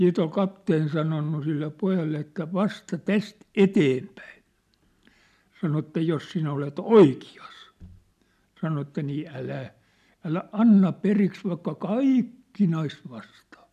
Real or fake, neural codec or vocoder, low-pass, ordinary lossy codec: real; none; 14.4 kHz; none